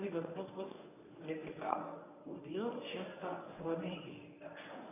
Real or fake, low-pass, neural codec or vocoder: fake; 3.6 kHz; codec, 24 kHz, 0.9 kbps, WavTokenizer, medium speech release version 1